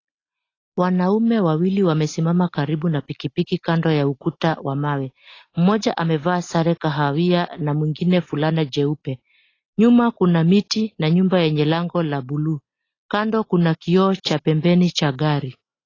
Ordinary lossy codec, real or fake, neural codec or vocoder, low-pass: AAC, 32 kbps; real; none; 7.2 kHz